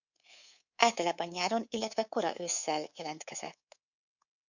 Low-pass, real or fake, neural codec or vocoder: 7.2 kHz; fake; codec, 24 kHz, 3.1 kbps, DualCodec